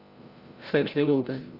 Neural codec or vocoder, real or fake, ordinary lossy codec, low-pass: codec, 16 kHz, 0.5 kbps, FreqCodec, larger model; fake; Opus, 32 kbps; 5.4 kHz